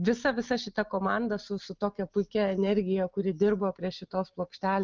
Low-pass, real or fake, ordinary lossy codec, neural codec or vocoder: 7.2 kHz; real; Opus, 24 kbps; none